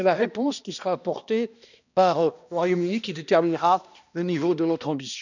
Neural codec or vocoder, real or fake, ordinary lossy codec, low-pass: codec, 16 kHz, 1 kbps, X-Codec, HuBERT features, trained on balanced general audio; fake; none; 7.2 kHz